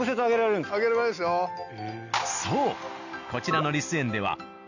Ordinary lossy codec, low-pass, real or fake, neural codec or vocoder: none; 7.2 kHz; real; none